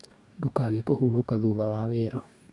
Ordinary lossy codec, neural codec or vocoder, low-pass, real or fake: none; codec, 44.1 kHz, 2.6 kbps, DAC; 10.8 kHz; fake